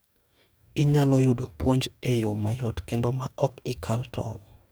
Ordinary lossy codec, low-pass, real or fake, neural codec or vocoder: none; none; fake; codec, 44.1 kHz, 2.6 kbps, DAC